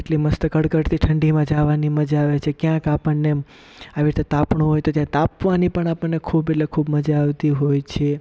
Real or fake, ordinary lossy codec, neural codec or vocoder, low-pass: real; none; none; none